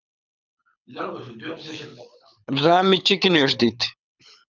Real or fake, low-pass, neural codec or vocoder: fake; 7.2 kHz; codec, 24 kHz, 6 kbps, HILCodec